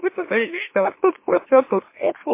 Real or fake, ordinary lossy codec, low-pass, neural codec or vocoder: fake; MP3, 24 kbps; 3.6 kHz; autoencoder, 44.1 kHz, a latent of 192 numbers a frame, MeloTTS